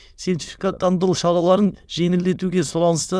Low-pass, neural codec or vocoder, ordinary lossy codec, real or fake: none; autoencoder, 22.05 kHz, a latent of 192 numbers a frame, VITS, trained on many speakers; none; fake